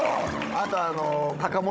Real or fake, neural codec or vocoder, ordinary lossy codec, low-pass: fake; codec, 16 kHz, 16 kbps, FunCodec, trained on Chinese and English, 50 frames a second; none; none